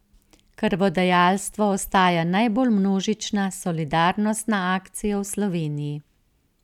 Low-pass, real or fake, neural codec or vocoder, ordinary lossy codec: 19.8 kHz; real; none; none